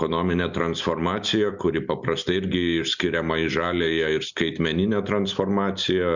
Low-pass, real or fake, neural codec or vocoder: 7.2 kHz; real; none